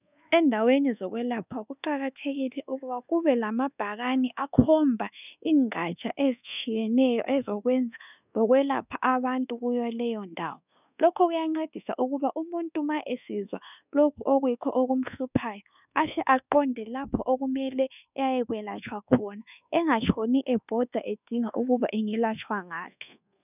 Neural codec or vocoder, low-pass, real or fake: codec, 24 kHz, 1.2 kbps, DualCodec; 3.6 kHz; fake